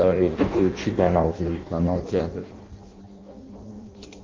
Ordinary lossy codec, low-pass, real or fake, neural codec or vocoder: Opus, 32 kbps; 7.2 kHz; fake; codec, 16 kHz in and 24 kHz out, 0.6 kbps, FireRedTTS-2 codec